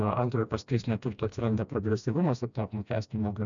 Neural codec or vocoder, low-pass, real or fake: codec, 16 kHz, 1 kbps, FreqCodec, smaller model; 7.2 kHz; fake